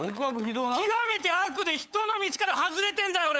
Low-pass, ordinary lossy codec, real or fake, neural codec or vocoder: none; none; fake; codec, 16 kHz, 8 kbps, FunCodec, trained on LibriTTS, 25 frames a second